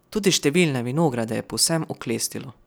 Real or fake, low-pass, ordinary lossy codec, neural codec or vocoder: real; none; none; none